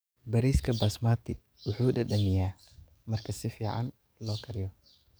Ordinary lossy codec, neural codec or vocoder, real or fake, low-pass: none; codec, 44.1 kHz, 7.8 kbps, DAC; fake; none